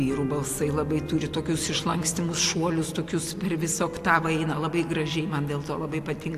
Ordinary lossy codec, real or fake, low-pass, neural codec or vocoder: AAC, 64 kbps; real; 14.4 kHz; none